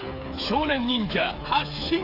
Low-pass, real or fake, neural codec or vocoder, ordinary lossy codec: 5.4 kHz; fake; codec, 16 kHz, 8 kbps, FreqCodec, smaller model; AAC, 32 kbps